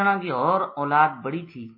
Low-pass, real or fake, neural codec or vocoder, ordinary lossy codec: 5.4 kHz; real; none; MP3, 24 kbps